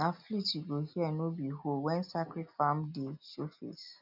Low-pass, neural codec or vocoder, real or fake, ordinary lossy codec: 5.4 kHz; none; real; none